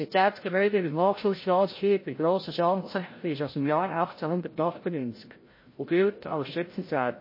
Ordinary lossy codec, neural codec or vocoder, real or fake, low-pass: MP3, 24 kbps; codec, 16 kHz, 0.5 kbps, FreqCodec, larger model; fake; 5.4 kHz